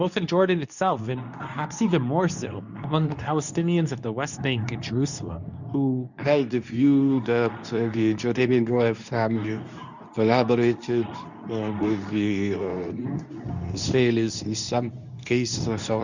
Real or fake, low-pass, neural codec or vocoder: fake; 7.2 kHz; codec, 24 kHz, 0.9 kbps, WavTokenizer, medium speech release version 2